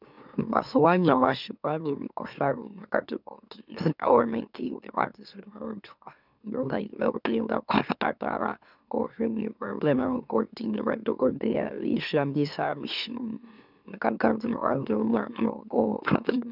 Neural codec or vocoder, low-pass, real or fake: autoencoder, 44.1 kHz, a latent of 192 numbers a frame, MeloTTS; 5.4 kHz; fake